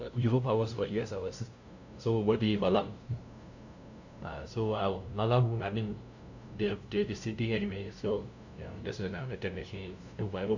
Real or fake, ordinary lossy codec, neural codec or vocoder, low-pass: fake; none; codec, 16 kHz, 0.5 kbps, FunCodec, trained on LibriTTS, 25 frames a second; 7.2 kHz